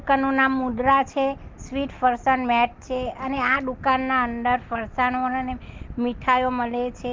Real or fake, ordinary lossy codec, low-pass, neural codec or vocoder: real; Opus, 32 kbps; 7.2 kHz; none